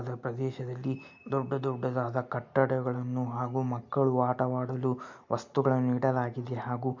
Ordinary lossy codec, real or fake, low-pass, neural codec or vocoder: MP3, 48 kbps; real; 7.2 kHz; none